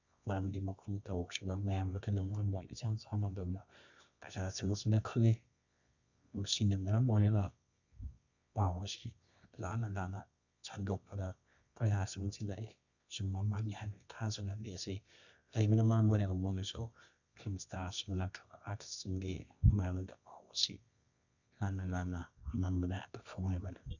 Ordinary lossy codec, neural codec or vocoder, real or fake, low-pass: none; codec, 24 kHz, 0.9 kbps, WavTokenizer, medium music audio release; fake; 7.2 kHz